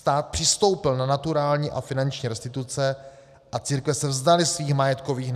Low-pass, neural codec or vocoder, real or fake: 14.4 kHz; none; real